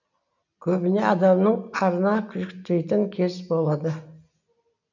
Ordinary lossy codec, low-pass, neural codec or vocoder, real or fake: none; 7.2 kHz; none; real